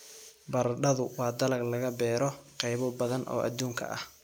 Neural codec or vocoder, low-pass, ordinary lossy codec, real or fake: none; none; none; real